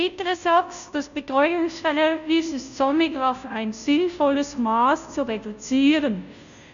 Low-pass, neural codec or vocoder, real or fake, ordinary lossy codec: 7.2 kHz; codec, 16 kHz, 0.5 kbps, FunCodec, trained on Chinese and English, 25 frames a second; fake; none